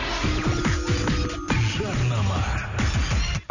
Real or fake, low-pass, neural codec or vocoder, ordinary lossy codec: real; 7.2 kHz; none; none